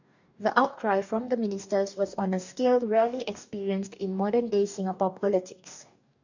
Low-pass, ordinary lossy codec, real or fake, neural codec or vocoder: 7.2 kHz; none; fake; codec, 44.1 kHz, 2.6 kbps, DAC